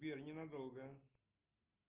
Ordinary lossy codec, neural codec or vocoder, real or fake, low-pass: Opus, 32 kbps; none; real; 3.6 kHz